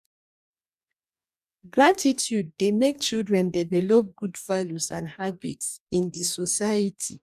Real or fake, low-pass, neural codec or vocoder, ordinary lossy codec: fake; 14.4 kHz; codec, 44.1 kHz, 2.6 kbps, DAC; none